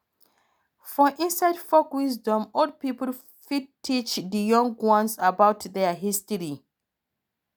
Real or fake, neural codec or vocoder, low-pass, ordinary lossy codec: real; none; none; none